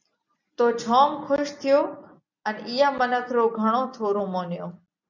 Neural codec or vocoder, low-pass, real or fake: none; 7.2 kHz; real